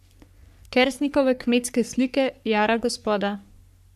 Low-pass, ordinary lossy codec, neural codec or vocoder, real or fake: 14.4 kHz; none; codec, 44.1 kHz, 3.4 kbps, Pupu-Codec; fake